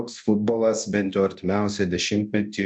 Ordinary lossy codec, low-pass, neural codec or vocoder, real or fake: MP3, 64 kbps; 9.9 kHz; codec, 24 kHz, 0.9 kbps, DualCodec; fake